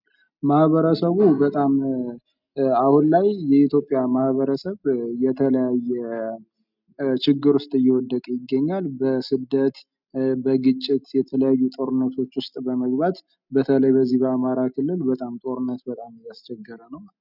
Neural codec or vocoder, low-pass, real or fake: none; 5.4 kHz; real